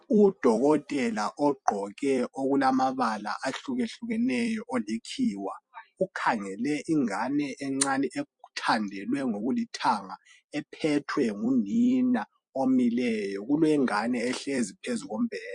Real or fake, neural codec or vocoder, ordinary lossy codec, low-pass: fake; vocoder, 44.1 kHz, 128 mel bands every 256 samples, BigVGAN v2; MP3, 64 kbps; 10.8 kHz